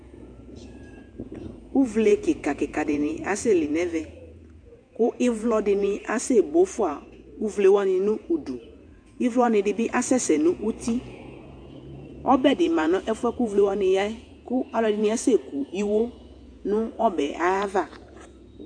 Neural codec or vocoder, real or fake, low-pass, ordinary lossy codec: vocoder, 48 kHz, 128 mel bands, Vocos; fake; 9.9 kHz; AAC, 64 kbps